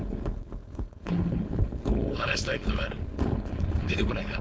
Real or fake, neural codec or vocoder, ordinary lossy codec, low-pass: fake; codec, 16 kHz, 4.8 kbps, FACodec; none; none